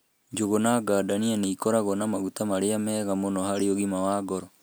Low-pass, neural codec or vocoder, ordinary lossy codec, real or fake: none; none; none; real